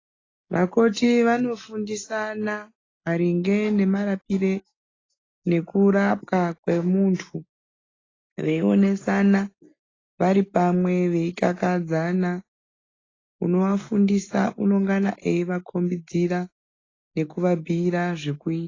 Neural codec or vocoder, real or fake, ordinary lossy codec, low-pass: none; real; AAC, 32 kbps; 7.2 kHz